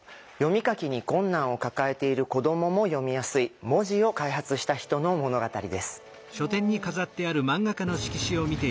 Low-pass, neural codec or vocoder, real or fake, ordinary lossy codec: none; none; real; none